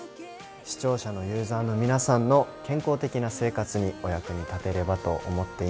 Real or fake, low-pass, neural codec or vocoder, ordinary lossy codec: real; none; none; none